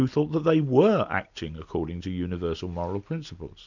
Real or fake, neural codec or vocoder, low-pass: real; none; 7.2 kHz